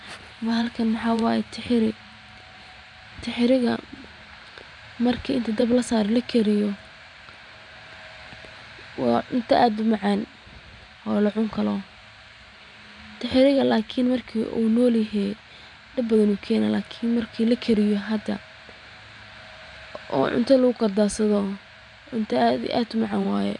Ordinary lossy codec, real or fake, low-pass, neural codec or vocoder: none; fake; 10.8 kHz; vocoder, 44.1 kHz, 128 mel bands every 512 samples, BigVGAN v2